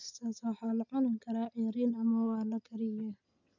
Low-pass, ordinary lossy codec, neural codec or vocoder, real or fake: 7.2 kHz; none; none; real